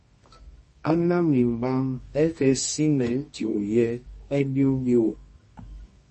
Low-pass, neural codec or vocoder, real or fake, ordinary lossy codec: 10.8 kHz; codec, 24 kHz, 0.9 kbps, WavTokenizer, medium music audio release; fake; MP3, 32 kbps